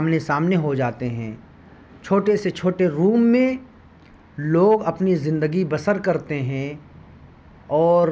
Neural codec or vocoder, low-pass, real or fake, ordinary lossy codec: none; none; real; none